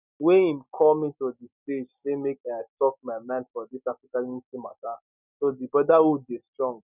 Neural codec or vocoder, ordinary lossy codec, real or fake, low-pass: none; none; real; 3.6 kHz